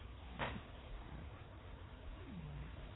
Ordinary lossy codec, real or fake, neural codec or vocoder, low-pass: AAC, 16 kbps; fake; codec, 16 kHz, 4 kbps, FreqCodec, larger model; 7.2 kHz